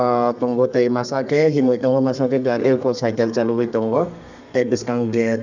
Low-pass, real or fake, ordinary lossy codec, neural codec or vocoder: 7.2 kHz; fake; none; codec, 32 kHz, 1.9 kbps, SNAC